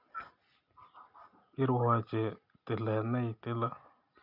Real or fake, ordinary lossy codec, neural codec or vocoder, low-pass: real; none; none; 5.4 kHz